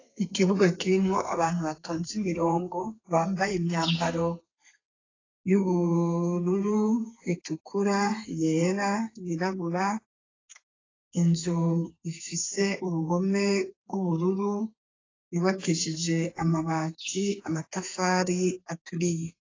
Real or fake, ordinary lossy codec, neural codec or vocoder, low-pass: fake; AAC, 32 kbps; codec, 32 kHz, 1.9 kbps, SNAC; 7.2 kHz